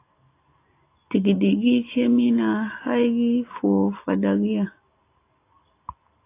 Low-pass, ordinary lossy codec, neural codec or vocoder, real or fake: 3.6 kHz; AAC, 24 kbps; none; real